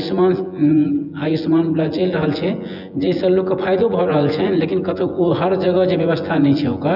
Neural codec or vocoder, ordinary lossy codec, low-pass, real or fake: vocoder, 24 kHz, 100 mel bands, Vocos; none; 5.4 kHz; fake